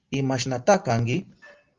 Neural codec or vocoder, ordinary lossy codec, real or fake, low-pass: none; Opus, 32 kbps; real; 7.2 kHz